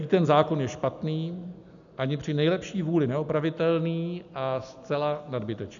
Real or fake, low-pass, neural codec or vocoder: real; 7.2 kHz; none